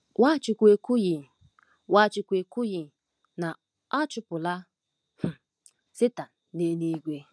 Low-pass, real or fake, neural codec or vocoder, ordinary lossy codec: none; real; none; none